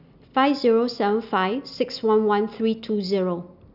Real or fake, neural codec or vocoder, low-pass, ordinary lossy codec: real; none; 5.4 kHz; none